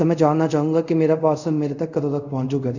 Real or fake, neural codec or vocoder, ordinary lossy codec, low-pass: fake; codec, 24 kHz, 0.5 kbps, DualCodec; none; 7.2 kHz